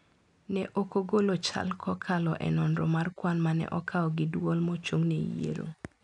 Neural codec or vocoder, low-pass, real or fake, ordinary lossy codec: none; 10.8 kHz; real; none